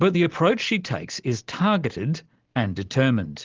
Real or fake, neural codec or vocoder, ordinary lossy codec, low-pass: real; none; Opus, 16 kbps; 7.2 kHz